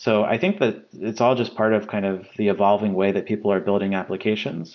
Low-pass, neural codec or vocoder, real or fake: 7.2 kHz; none; real